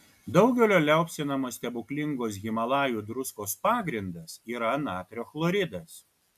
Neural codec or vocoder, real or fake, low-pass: none; real; 14.4 kHz